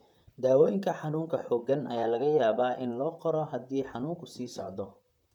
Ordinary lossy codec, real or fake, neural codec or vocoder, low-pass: none; fake; vocoder, 44.1 kHz, 128 mel bands, Pupu-Vocoder; 19.8 kHz